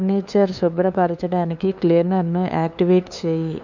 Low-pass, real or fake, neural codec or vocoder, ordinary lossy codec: 7.2 kHz; fake; codec, 16 kHz, 2 kbps, FunCodec, trained on LibriTTS, 25 frames a second; none